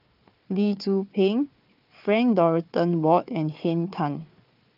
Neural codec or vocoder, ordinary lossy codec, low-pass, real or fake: codec, 16 kHz, 4 kbps, FunCodec, trained on Chinese and English, 50 frames a second; Opus, 32 kbps; 5.4 kHz; fake